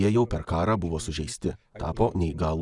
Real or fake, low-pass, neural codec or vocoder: real; 10.8 kHz; none